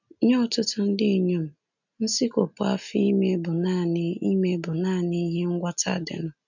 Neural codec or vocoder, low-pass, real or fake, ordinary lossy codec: none; 7.2 kHz; real; none